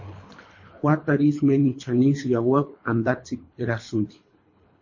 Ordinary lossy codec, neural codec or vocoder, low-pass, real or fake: MP3, 32 kbps; codec, 24 kHz, 3 kbps, HILCodec; 7.2 kHz; fake